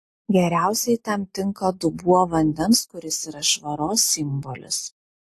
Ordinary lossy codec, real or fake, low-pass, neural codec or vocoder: AAC, 48 kbps; real; 14.4 kHz; none